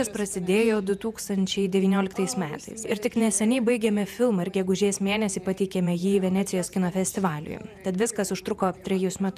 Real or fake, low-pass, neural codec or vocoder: fake; 14.4 kHz; vocoder, 48 kHz, 128 mel bands, Vocos